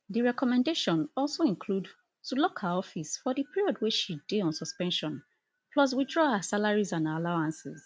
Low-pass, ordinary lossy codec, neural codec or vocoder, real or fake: none; none; none; real